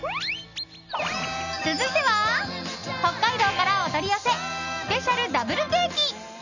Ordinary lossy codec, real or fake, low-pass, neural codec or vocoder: none; real; 7.2 kHz; none